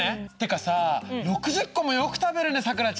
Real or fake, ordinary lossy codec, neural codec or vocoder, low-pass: real; none; none; none